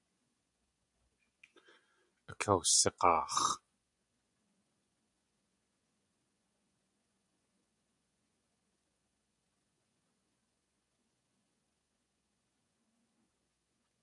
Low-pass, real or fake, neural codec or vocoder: 10.8 kHz; fake; vocoder, 24 kHz, 100 mel bands, Vocos